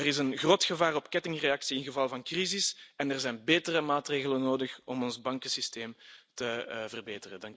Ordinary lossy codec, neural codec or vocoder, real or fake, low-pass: none; none; real; none